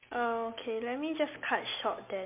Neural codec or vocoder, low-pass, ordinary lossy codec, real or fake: none; 3.6 kHz; MP3, 32 kbps; real